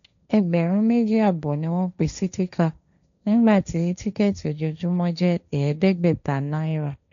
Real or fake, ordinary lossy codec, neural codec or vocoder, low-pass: fake; none; codec, 16 kHz, 1.1 kbps, Voila-Tokenizer; 7.2 kHz